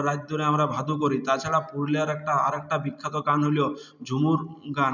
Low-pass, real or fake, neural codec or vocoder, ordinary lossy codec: 7.2 kHz; real; none; none